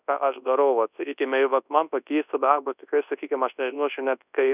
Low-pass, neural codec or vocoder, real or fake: 3.6 kHz; codec, 24 kHz, 0.9 kbps, WavTokenizer, large speech release; fake